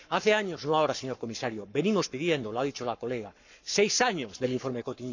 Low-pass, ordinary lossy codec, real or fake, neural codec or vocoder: 7.2 kHz; none; fake; codec, 44.1 kHz, 7.8 kbps, Pupu-Codec